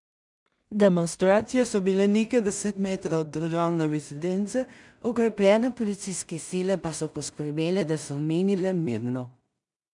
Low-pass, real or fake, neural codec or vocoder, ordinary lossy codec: 10.8 kHz; fake; codec, 16 kHz in and 24 kHz out, 0.4 kbps, LongCat-Audio-Codec, two codebook decoder; none